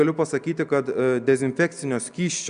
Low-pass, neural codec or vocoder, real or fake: 9.9 kHz; none; real